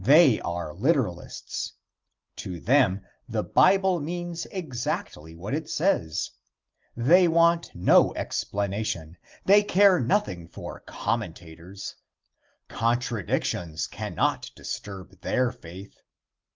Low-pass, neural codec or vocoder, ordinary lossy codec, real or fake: 7.2 kHz; none; Opus, 32 kbps; real